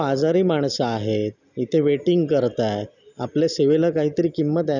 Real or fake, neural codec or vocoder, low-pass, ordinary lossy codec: real; none; 7.2 kHz; none